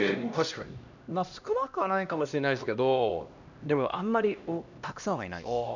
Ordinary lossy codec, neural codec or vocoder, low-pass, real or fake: none; codec, 16 kHz, 1 kbps, X-Codec, HuBERT features, trained on LibriSpeech; 7.2 kHz; fake